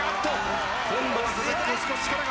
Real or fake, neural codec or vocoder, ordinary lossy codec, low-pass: real; none; none; none